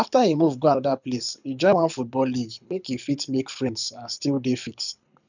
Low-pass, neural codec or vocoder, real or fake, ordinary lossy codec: 7.2 kHz; codec, 24 kHz, 6 kbps, HILCodec; fake; none